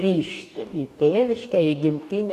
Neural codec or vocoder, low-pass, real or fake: codec, 44.1 kHz, 2.6 kbps, DAC; 14.4 kHz; fake